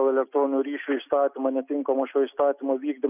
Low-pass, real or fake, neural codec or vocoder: 3.6 kHz; real; none